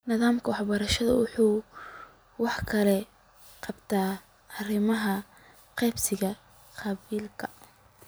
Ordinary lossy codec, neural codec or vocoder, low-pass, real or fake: none; none; none; real